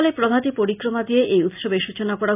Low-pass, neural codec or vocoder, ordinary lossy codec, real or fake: 3.6 kHz; none; none; real